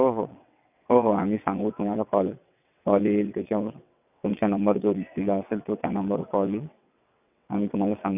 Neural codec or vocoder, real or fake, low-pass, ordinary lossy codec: vocoder, 22.05 kHz, 80 mel bands, WaveNeXt; fake; 3.6 kHz; none